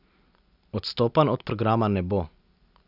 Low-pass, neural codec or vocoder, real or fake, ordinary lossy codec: 5.4 kHz; none; real; none